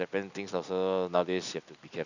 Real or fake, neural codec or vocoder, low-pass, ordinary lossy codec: real; none; 7.2 kHz; none